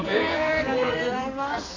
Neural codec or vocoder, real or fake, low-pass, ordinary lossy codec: codec, 44.1 kHz, 2.6 kbps, SNAC; fake; 7.2 kHz; AAC, 32 kbps